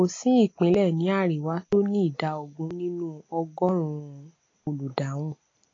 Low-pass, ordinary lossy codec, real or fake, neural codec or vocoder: 7.2 kHz; AAC, 32 kbps; real; none